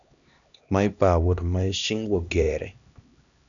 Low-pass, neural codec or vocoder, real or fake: 7.2 kHz; codec, 16 kHz, 1 kbps, X-Codec, HuBERT features, trained on LibriSpeech; fake